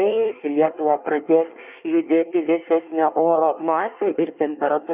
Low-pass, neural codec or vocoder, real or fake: 3.6 kHz; codec, 24 kHz, 1 kbps, SNAC; fake